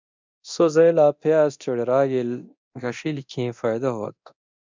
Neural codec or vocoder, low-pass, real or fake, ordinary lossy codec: codec, 24 kHz, 0.9 kbps, DualCodec; 7.2 kHz; fake; MP3, 64 kbps